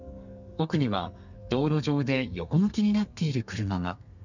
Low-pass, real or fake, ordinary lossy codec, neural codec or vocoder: 7.2 kHz; fake; none; codec, 32 kHz, 1.9 kbps, SNAC